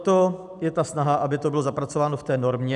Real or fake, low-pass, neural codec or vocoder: real; 10.8 kHz; none